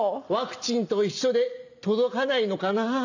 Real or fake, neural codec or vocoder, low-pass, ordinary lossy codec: real; none; 7.2 kHz; none